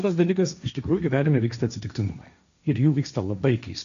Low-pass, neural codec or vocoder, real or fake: 7.2 kHz; codec, 16 kHz, 1.1 kbps, Voila-Tokenizer; fake